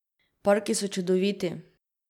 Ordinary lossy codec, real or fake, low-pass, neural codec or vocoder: none; real; 19.8 kHz; none